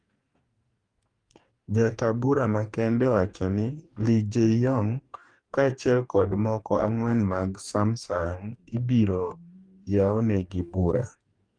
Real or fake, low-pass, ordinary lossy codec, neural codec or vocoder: fake; 9.9 kHz; Opus, 32 kbps; codec, 44.1 kHz, 2.6 kbps, DAC